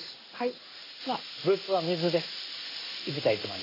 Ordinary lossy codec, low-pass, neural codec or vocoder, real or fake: AAC, 32 kbps; 5.4 kHz; codec, 16 kHz in and 24 kHz out, 1 kbps, XY-Tokenizer; fake